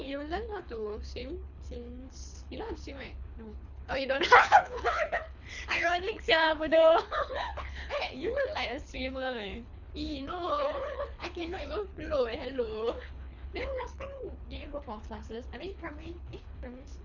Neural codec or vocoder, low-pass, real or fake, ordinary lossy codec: codec, 24 kHz, 3 kbps, HILCodec; 7.2 kHz; fake; none